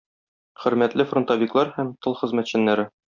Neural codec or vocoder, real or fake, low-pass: none; real; 7.2 kHz